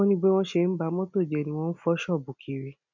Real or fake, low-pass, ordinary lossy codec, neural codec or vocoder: real; 7.2 kHz; none; none